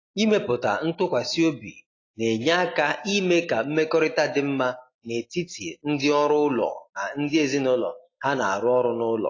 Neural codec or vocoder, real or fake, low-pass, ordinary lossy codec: none; real; 7.2 kHz; AAC, 32 kbps